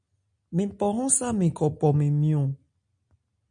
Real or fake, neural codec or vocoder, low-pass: real; none; 9.9 kHz